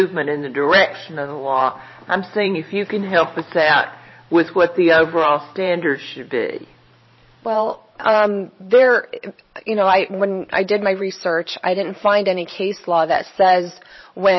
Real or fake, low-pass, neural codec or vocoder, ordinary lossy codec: fake; 7.2 kHz; vocoder, 44.1 kHz, 128 mel bands every 512 samples, BigVGAN v2; MP3, 24 kbps